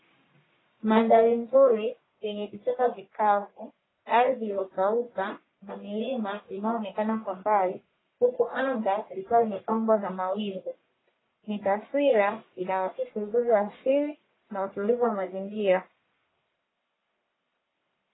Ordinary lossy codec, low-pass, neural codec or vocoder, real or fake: AAC, 16 kbps; 7.2 kHz; codec, 44.1 kHz, 1.7 kbps, Pupu-Codec; fake